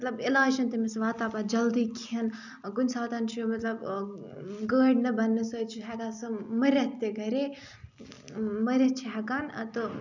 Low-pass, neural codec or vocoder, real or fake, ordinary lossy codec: 7.2 kHz; none; real; none